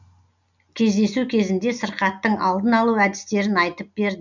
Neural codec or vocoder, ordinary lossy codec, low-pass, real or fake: none; none; 7.2 kHz; real